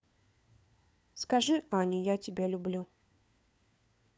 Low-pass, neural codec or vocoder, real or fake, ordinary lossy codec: none; codec, 16 kHz, 4 kbps, FunCodec, trained on LibriTTS, 50 frames a second; fake; none